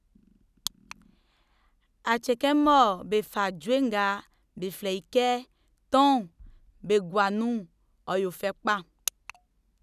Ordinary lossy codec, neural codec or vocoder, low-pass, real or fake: none; none; 14.4 kHz; real